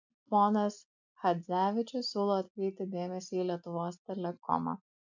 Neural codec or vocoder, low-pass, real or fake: none; 7.2 kHz; real